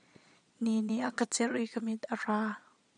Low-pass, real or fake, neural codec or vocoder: 9.9 kHz; fake; vocoder, 22.05 kHz, 80 mel bands, Vocos